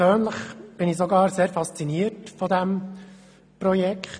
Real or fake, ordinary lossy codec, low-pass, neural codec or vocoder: real; none; 9.9 kHz; none